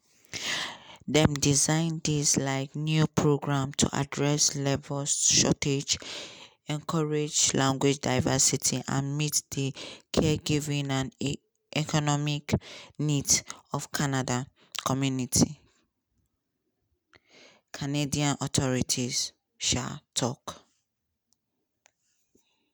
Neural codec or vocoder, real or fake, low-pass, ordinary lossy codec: none; real; none; none